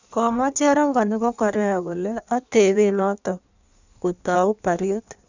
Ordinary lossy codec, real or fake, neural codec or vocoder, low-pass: none; fake; codec, 16 kHz in and 24 kHz out, 1.1 kbps, FireRedTTS-2 codec; 7.2 kHz